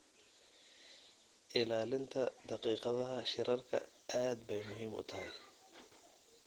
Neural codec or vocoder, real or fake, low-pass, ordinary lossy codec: vocoder, 48 kHz, 128 mel bands, Vocos; fake; 19.8 kHz; Opus, 16 kbps